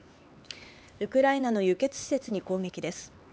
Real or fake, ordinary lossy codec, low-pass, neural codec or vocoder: fake; none; none; codec, 16 kHz, 4 kbps, X-Codec, HuBERT features, trained on LibriSpeech